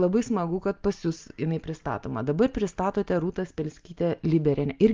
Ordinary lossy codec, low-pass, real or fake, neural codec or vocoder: Opus, 32 kbps; 7.2 kHz; real; none